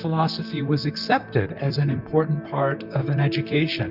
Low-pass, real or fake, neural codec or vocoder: 5.4 kHz; fake; vocoder, 44.1 kHz, 128 mel bands, Pupu-Vocoder